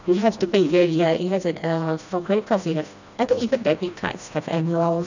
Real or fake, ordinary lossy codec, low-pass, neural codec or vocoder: fake; none; 7.2 kHz; codec, 16 kHz, 1 kbps, FreqCodec, smaller model